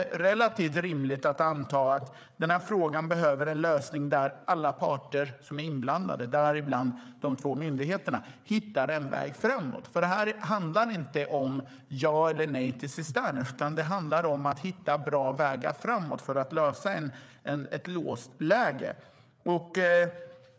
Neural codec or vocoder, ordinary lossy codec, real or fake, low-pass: codec, 16 kHz, 4 kbps, FreqCodec, larger model; none; fake; none